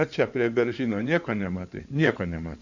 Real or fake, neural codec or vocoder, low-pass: fake; codec, 16 kHz in and 24 kHz out, 2.2 kbps, FireRedTTS-2 codec; 7.2 kHz